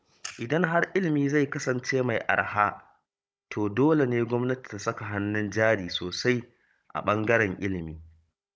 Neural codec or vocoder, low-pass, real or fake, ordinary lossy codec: codec, 16 kHz, 16 kbps, FunCodec, trained on Chinese and English, 50 frames a second; none; fake; none